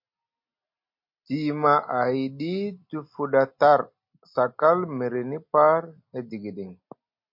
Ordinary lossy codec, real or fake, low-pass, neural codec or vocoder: MP3, 32 kbps; real; 5.4 kHz; none